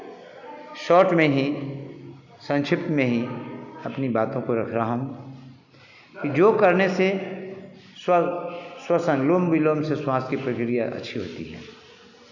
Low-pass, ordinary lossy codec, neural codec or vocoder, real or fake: 7.2 kHz; none; none; real